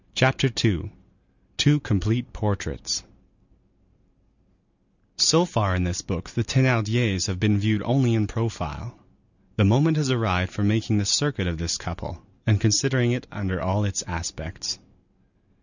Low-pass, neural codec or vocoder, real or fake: 7.2 kHz; none; real